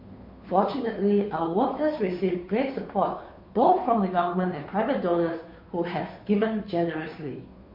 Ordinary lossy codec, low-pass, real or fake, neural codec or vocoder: MP3, 32 kbps; 5.4 kHz; fake; codec, 16 kHz, 2 kbps, FunCodec, trained on Chinese and English, 25 frames a second